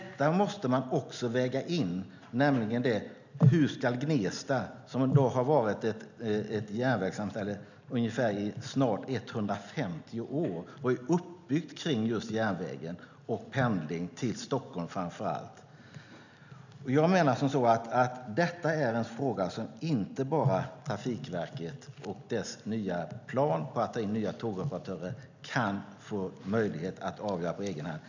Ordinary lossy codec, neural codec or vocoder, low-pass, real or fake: none; none; 7.2 kHz; real